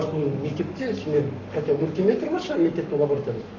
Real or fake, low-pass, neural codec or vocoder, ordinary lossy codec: fake; 7.2 kHz; codec, 44.1 kHz, 7.8 kbps, Pupu-Codec; none